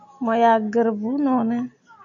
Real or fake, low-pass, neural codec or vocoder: real; 7.2 kHz; none